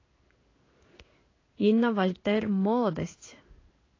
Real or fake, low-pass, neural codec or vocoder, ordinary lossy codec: fake; 7.2 kHz; codec, 16 kHz in and 24 kHz out, 1 kbps, XY-Tokenizer; AAC, 32 kbps